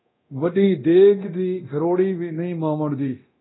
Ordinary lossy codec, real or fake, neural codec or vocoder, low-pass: AAC, 16 kbps; fake; codec, 24 kHz, 0.9 kbps, DualCodec; 7.2 kHz